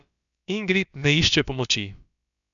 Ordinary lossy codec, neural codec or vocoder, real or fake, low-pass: none; codec, 16 kHz, about 1 kbps, DyCAST, with the encoder's durations; fake; 7.2 kHz